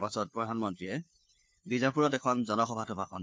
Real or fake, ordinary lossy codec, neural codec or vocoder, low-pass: fake; none; codec, 16 kHz, 4 kbps, FunCodec, trained on LibriTTS, 50 frames a second; none